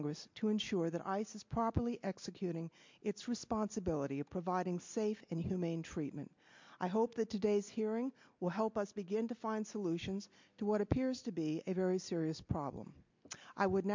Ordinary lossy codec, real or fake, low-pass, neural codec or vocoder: MP3, 64 kbps; real; 7.2 kHz; none